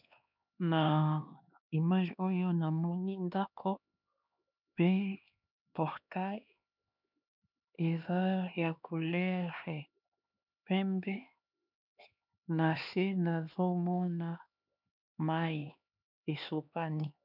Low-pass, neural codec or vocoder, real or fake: 5.4 kHz; codec, 16 kHz, 2 kbps, X-Codec, HuBERT features, trained on LibriSpeech; fake